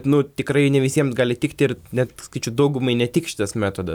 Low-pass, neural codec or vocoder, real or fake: 19.8 kHz; vocoder, 44.1 kHz, 128 mel bands every 256 samples, BigVGAN v2; fake